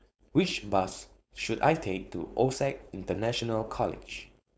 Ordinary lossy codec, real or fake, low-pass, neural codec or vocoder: none; fake; none; codec, 16 kHz, 4.8 kbps, FACodec